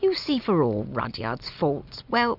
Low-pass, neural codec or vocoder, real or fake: 5.4 kHz; none; real